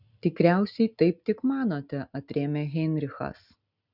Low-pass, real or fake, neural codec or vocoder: 5.4 kHz; real; none